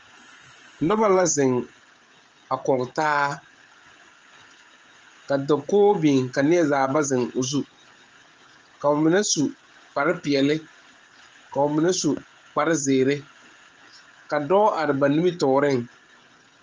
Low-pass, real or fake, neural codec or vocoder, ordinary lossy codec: 7.2 kHz; fake; codec, 16 kHz, 16 kbps, FreqCodec, smaller model; Opus, 24 kbps